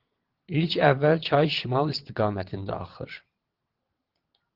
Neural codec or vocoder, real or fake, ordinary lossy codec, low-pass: vocoder, 22.05 kHz, 80 mel bands, WaveNeXt; fake; Opus, 16 kbps; 5.4 kHz